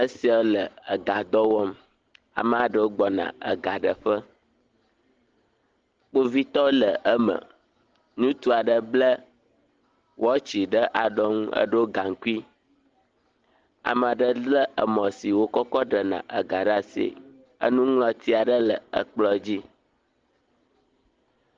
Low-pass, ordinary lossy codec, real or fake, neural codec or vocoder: 7.2 kHz; Opus, 16 kbps; real; none